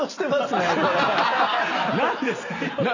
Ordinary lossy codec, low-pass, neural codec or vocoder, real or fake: none; 7.2 kHz; none; real